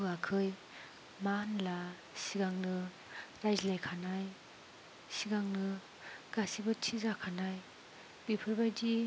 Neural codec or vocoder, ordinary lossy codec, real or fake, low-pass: none; none; real; none